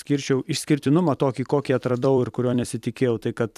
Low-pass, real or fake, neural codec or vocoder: 14.4 kHz; fake; vocoder, 44.1 kHz, 128 mel bands every 256 samples, BigVGAN v2